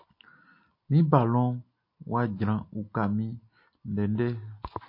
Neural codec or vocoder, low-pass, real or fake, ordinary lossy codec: none; 5.4 kHz; real; MP3, 32 kbps